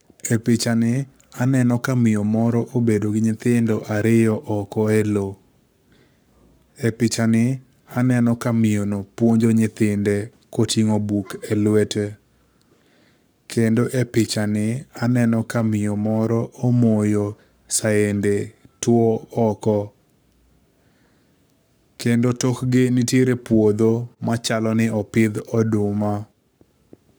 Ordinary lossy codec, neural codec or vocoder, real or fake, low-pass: none; codec, 44.1 kHz, 7.8 kbps, Pupu-Codec; fake; none